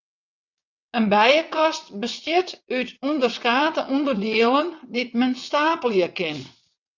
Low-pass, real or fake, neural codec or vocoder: 7.2 kHz; fake; vocoder, 22.05 kHz, 80 mel bands, WaveNeXt